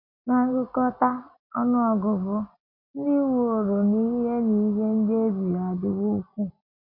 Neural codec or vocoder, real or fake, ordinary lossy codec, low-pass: none; real; MP3, 32 kbps; 5.4 kHz